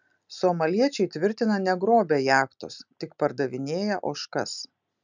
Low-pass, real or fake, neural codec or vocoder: 7.2 kHz; real; none